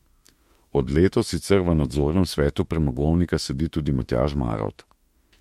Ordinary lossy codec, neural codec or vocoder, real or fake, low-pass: MP3, 64 kbps; autoencoder, 48 kHz, 32 numbers a frame, DAC-VAE, trained on Japanese speech; fake; 19.8 kHz